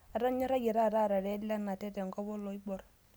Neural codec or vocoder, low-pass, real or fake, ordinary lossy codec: none; none; real; none